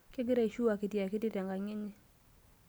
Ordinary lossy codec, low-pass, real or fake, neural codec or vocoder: none; none; real; none